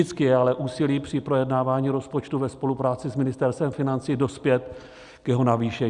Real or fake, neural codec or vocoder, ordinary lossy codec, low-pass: real; none; Opus, 64 kbps; 10.8 kHz